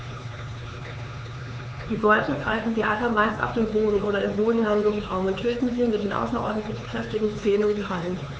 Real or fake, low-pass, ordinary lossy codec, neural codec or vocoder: fake; none; none; codec, 16 kHz, 4 kbps, X-Codec, HuBERT features, trained on LibriSpeech